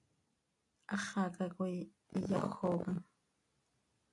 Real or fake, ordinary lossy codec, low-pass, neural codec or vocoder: real; AAC, 48 kbps; 10.8 kHz; none